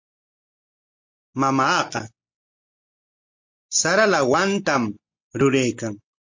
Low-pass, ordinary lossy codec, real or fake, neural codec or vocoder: 7.2 kHz; MP3, 48 kbps; real; none